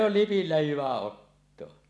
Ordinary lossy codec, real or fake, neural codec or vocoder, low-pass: none; real; none; none